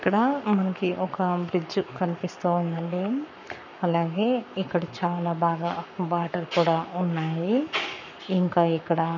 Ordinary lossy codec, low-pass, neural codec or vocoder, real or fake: none; 7.2 kHz; codec, 44.1 kHz, 7.8 kbps, Pupu-Codec; fake